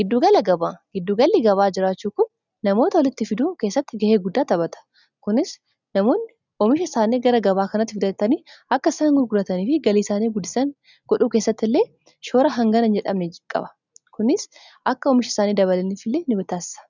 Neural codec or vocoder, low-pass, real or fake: none; 7.2 kHz; real